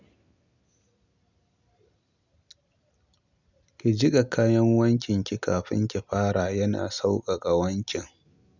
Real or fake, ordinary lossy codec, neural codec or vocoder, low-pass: real; none; none; 7.2 kHz